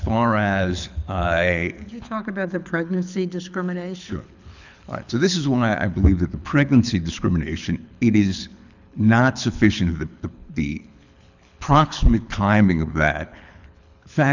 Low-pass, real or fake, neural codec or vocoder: 7.2 kHz; fake; codec, 24 kHz, 6 kbps, HILCodec